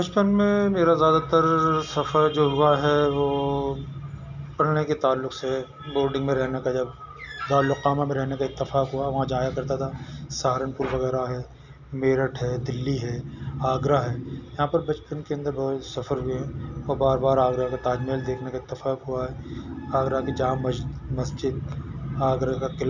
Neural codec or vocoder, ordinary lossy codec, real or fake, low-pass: none; none; real; 7.2 kHz